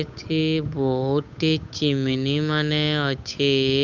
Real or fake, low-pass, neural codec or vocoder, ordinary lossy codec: fake; 7.2 kHz; codec, 16 kHz, 8 kbps, FunCodec, trained on Chinese and English, 25 frames a second; none